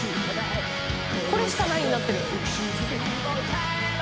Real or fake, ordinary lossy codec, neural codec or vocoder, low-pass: real; none; none; none